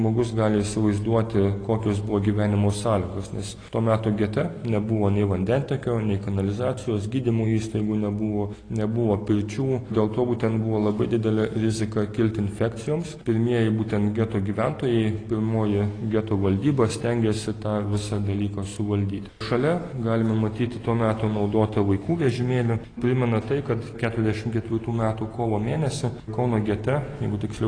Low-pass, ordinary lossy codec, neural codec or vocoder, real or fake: 9.9 kHz; AAC, 32 kbps; none; real